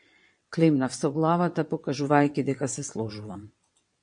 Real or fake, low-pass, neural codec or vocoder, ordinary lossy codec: fake; 9.9 kHz; vocoder, 22.05 kHz, 80 mel bands, WaveNeXt; MP3, 48 kbps